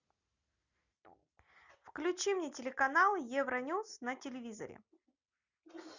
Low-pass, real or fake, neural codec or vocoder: 7.2 kHz; real; none